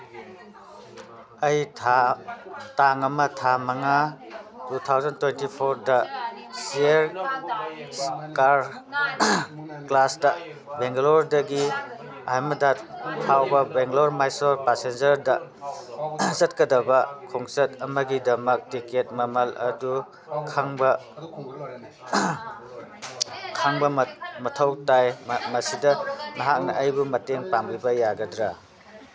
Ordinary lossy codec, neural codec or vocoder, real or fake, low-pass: none; none; real; none